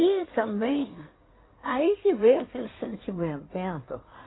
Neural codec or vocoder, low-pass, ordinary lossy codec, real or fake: codec, 16 kHz in and 24 kHz out, 2.2 kbps, FireRedTTS-2 codec; 7.2 kHz; AAC, 16 kbps; fake